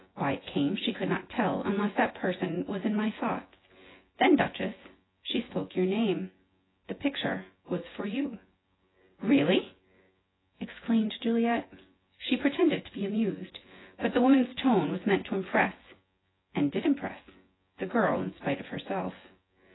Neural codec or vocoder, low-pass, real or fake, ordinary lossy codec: vocoder, 24 kHz, 100 mel bands, Vocos; 7.2 kHz; fake; AAC, 16 kbps